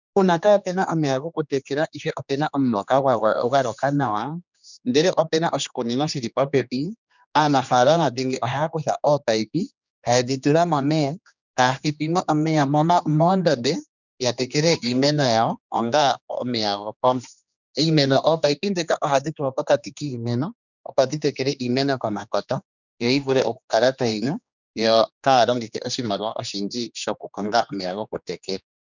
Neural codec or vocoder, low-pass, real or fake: codec, 16 kHz, 2 kbps, X-Codec, HuBERT features, trained on general audio; 7.2 kHz; fake